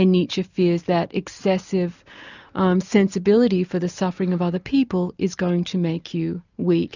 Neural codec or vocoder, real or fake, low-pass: none; real; 7.2 kHz